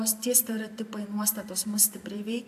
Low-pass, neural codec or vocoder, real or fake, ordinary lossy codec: 14.4 kHz; vocoder, 44.1 kHz, 128 mel bands every 512 samples, BigVGAN v2; fake; AAC, 96 kbps